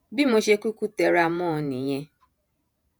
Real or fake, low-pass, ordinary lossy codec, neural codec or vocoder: fake; none; none; vocoder, 48 kHz, 128 mel bands, Vocos